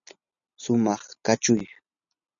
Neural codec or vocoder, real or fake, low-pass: none; real; 7.2 kHz